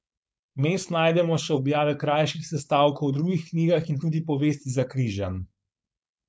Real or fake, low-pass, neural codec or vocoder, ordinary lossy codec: fake; none; codec, 16 kHz, 4.8 kbps, FACodec; none